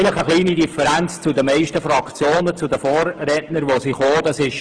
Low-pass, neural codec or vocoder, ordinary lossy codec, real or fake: 9.9 kHz; autoencoder, 48 kHz, 128 numbers a frame, DAC-VAE, trained on Japanese speech; Opus, 16 kbps; fake